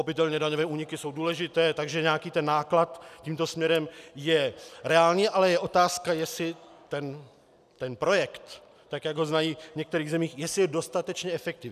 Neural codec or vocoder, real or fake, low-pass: none; real; 14.4 kHz